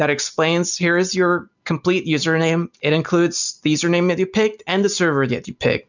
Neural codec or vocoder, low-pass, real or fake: none; 7.2 kHz; real